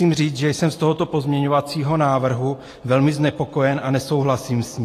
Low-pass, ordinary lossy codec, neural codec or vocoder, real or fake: 14.4 kHz; AAC, 48 kbps; none; real